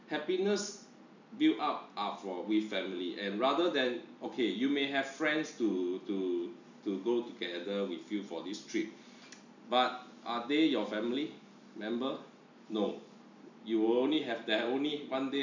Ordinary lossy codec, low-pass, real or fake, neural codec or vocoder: none; 7.2 kHz; real; none